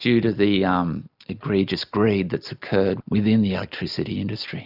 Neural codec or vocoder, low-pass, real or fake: none; 5.4 kHz; real